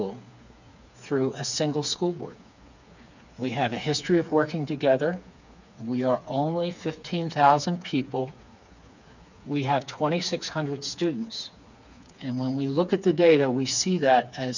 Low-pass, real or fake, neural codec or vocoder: 7.2 kHz; fake; codec, 16 kHz, 4 kbps, FreqCodec, smaller model